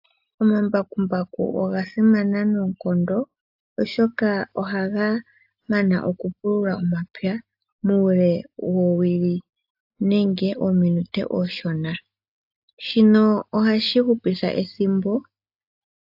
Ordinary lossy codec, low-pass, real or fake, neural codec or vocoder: AAC, 48 kbps; 5.4 kHz; real; none